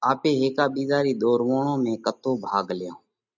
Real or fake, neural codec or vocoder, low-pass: real; none; 7.2 kHz